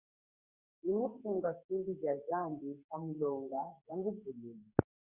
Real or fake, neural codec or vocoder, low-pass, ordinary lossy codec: fake; codec, 44.1 kHz, 2.6 kbps, SNAC; 3.6 kHz; Opus, 64 kbps